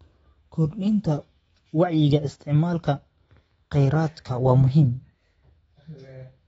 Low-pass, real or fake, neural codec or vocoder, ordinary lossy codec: 19.8 kHz; fake; autoencoder, 48 kHz, 128 numbers a frame, DAC-VAE, trained on Japanese speech; AAC, 24 kbps